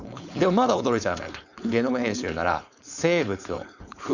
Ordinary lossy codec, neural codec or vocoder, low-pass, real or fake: none; codec, 16 kHz, 4.8 kbps, FACodec; 7.2 kHz; fake